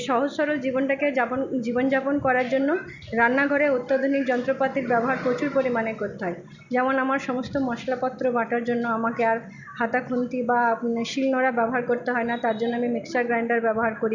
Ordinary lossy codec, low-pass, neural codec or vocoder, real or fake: Opus, 64 kbps; 7.2 kHz; none; real